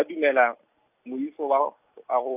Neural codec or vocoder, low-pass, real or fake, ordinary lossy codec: none; 3.6 kHz; real; none